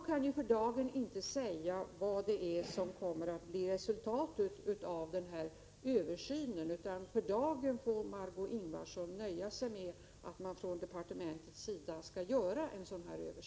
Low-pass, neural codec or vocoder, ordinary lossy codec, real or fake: none; none; none; real